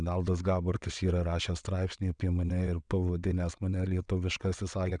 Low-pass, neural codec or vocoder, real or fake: 9.9 kHz; vocoder, 22.05 kHz, 80 mel bands, WaveNeXt; fake